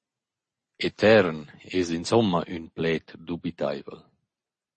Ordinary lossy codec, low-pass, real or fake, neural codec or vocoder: MP3, 32 kbps; 10.8 kHz; real; none